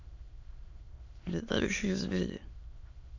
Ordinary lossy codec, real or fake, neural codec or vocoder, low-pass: AAC, 48 kbps; fake; autoencoder, 22.05 kHz, a latent of 192 numbers a frame, VITS, trained on many speakers; 7.2 kHz